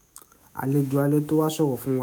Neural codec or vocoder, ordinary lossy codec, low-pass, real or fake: autoencoder, 48 kHz, 128 numbers a frame, DAC-VAE, trained on Japanese speech; none; none; fake